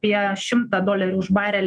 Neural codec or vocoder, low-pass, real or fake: autoencoder, 48 kHz, 128 numbers a frame, DAC-VAE, trained on Japanese speech; 9.9 kHz; fake